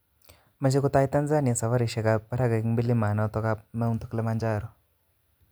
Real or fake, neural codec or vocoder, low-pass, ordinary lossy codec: real; none; none; none